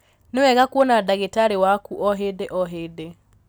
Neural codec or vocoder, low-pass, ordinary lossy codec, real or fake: none; none; none; real